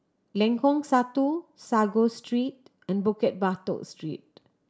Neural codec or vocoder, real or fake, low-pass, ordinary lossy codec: none; real; none; none